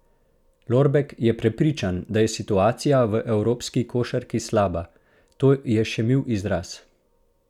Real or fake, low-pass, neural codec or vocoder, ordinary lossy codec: real; 19.8 kHz; none; none